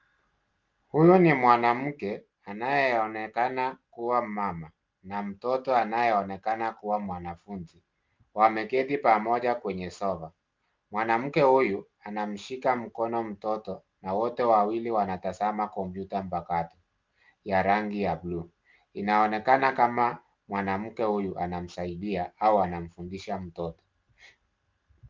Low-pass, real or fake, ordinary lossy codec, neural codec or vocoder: 7.2 kHz; real; Opus, 24 kbps; none